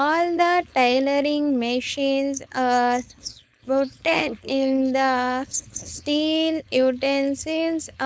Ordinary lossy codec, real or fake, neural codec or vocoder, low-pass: none; fake; codec, 16 kHz, 4.8 kbps, FACodec; none